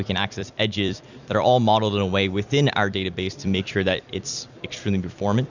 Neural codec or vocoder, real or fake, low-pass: vocoder, 44.1 kHz, 128 mel bands every 256 samples, BigVGAN v2; fake; 7.2 kHz